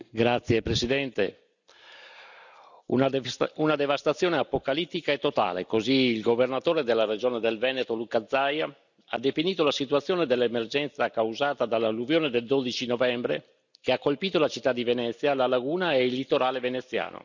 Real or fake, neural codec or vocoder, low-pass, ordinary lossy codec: real; none; 7.2 kHz; none